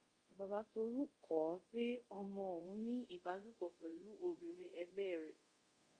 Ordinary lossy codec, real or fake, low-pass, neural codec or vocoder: Opus, 32 kbps; fake; 9.9 kHz; codec, 24 kHz, 0.5 kbps, DualCodec